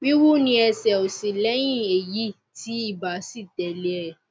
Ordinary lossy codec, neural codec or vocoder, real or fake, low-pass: none; none; real; none